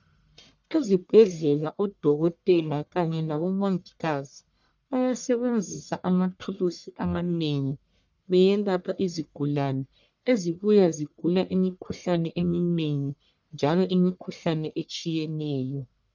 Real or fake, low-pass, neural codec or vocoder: fake; 7.2 kHz; codec, 44.1 kHz, 1.7 kbps, Pupu-Codec